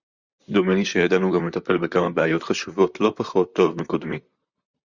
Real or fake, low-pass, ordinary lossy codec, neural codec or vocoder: fake; 7.2 kHz; Opus, 64 kbps; vocoder, 44.1 kHz, 128 mel bands, Pupu-Vocoder